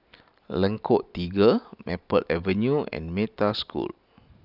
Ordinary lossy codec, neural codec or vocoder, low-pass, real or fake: none; none; 5.4 kHz; real